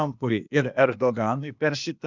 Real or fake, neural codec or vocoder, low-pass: fake; codec, 16 kHz, 0.8 kbps, ZipCodec; 7.2 kHz